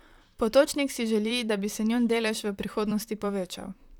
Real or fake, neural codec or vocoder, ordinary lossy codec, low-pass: fake; vocoder, 44.1 kHz, 128 mel bands, Pupu-Vocoder; none; 19.8 kHz